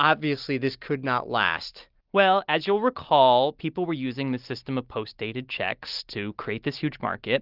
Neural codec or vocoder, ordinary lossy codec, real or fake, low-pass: none; Opus, 32 kbps; real; 5.4 kHz